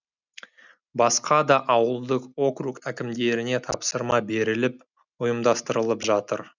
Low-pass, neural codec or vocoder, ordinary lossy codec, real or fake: 7.2 kHz; none; none; real